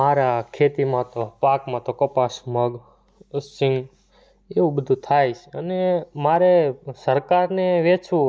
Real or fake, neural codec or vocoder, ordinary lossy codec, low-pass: real; none; none; none